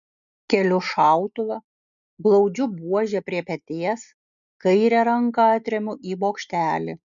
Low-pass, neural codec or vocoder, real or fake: 7.2 kHz; none; real